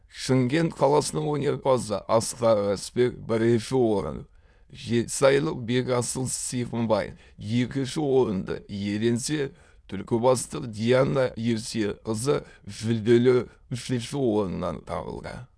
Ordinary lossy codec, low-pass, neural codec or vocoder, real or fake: none; none; autoencoder, 22.05 kHz, a latent of 192 numbers a frame, VITS, trained on many speakers; fake